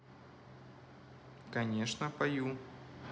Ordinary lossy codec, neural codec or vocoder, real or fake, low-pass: none; none; real; none